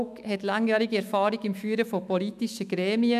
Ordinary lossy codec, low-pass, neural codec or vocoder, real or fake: none; 14.4 kHz; autoencoder, 48 kHz, 128 numbers a frame, DAC-VAE, trained on Japanese speech; fake